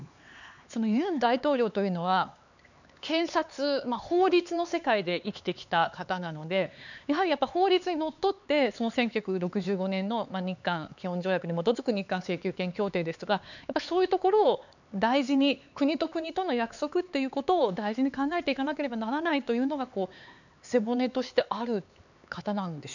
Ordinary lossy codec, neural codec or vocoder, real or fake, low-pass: none; codec, 16 kHz, 4 kbps, X-Codec, HuBERT features, trained on LibriSpeech; fake; 7.2 kHz